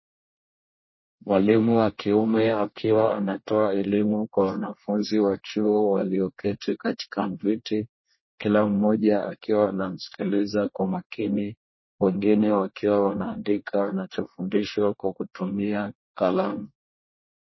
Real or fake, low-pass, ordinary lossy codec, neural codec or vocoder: fake; 7.2 kHz; MP3, 24 kbps; codec, 24 kHz, 1 kbps, SNAC